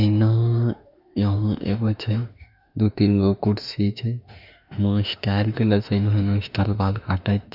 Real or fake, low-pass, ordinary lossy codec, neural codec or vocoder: fake; 5.4 kHz; none; autoencoder, 48 kHz, 32 numbers a frame, DAC-VAE, trained on Japanese speech